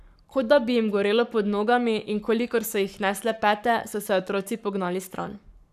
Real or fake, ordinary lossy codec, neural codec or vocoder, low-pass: fake; none; codec, 44.1 kHz, 7.8 kbps, Pupu-Codec; 14.4 kHz